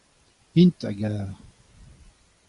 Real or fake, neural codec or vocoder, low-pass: real; none; 10.8 kHz